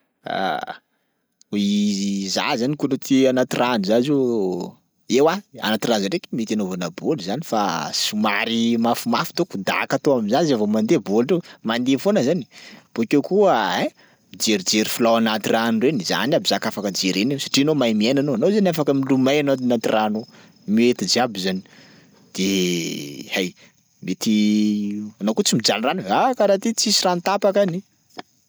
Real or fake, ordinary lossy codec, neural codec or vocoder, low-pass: real; none; none; none